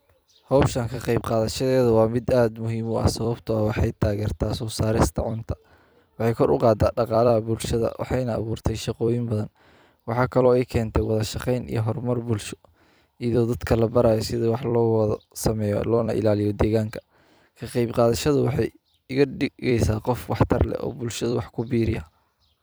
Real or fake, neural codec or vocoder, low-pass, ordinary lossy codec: fake; vocoder, 44.1 kHz, 128 mel bands every 256 samples, BigVGAN v2; none; none